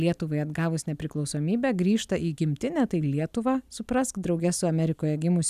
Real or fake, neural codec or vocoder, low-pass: real; none; 14.4 kHz